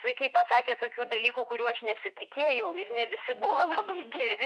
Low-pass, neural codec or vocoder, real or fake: 10.8 kHz; autoencoder, 48 kHz, 32 numbers a frame, DAC-VAE, trained on Japanese speech; fake